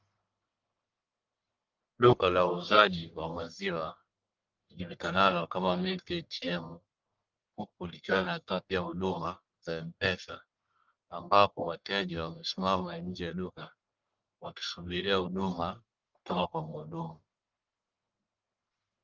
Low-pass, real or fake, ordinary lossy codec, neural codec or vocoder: 7.2 kHz; fake; Opus, 24 kbps; codec, 44.1 kHz, 1.7 kbps, Pupu-Codec